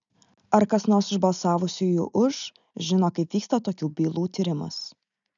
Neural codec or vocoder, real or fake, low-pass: none; real; 7.2 kHz